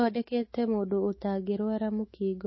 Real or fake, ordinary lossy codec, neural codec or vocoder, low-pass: fake; MP3, 24 kbps; autoencoder, 48 kHz, 128 numbers a frame, DAC-VAE, trained on Japanese speech; 7.2 kHz